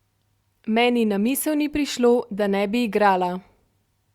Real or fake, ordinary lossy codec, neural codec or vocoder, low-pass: real; Opus, 64 kbps; none; 19.8 kHz